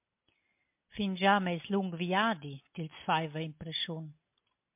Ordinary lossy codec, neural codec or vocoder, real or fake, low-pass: MP3, 32 kbps; none; real; 3.6 kHz